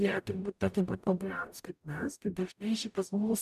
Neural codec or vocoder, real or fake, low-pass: codec, 44.1 kHz, 0.9 kbps, DAC; fake; 14.4 kHz